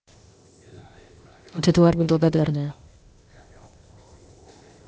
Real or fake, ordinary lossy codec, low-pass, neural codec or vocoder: fake; none; none; codec, 16 kHz, 0.8 kbps, ZipCodec